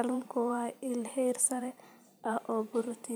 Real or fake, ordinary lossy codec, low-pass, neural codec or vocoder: fake; none; none; vocoder, 44.1 kHz, 128 mel bands every 512 samples, BigVGAN v2